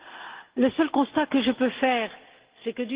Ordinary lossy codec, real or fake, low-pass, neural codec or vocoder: Opus, 16 kbps; real; 3.6 kHz; none